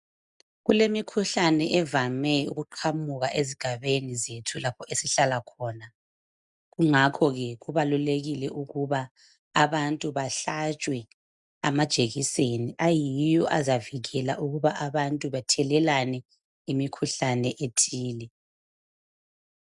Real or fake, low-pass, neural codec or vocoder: real; 10.8 kHz; none